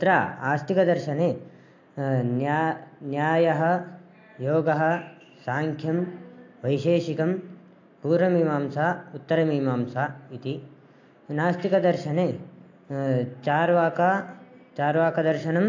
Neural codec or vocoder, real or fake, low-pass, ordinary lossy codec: none; real; 7.2 kHz; AAC, 48 kbps